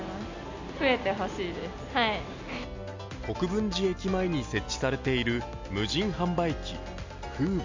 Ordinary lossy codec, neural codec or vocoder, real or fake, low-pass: MP3, 64 kbps; none; real; 7.2 kHz